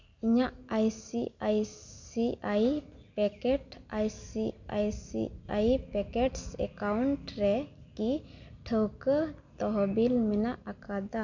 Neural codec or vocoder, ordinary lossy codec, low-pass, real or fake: none; none; 7.2 kHz; real